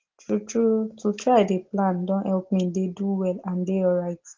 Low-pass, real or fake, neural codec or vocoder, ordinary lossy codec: 7.2 kHz; real; none; Opus, 16 kbps